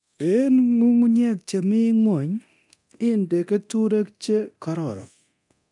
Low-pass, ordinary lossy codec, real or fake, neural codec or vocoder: 10.8 kHz; none; fake; codec, 24 kHz, 0.9 kbps, DualCodec